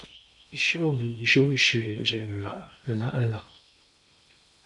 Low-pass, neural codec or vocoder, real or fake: 10.8 kHz; codec, 16 kHz in and 24 kHz out, 0.8 kbps, FocalCodec, streaming, 65536 codes; fake